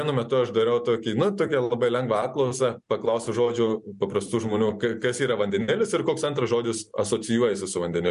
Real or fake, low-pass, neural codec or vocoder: real; 10.8 kHz; none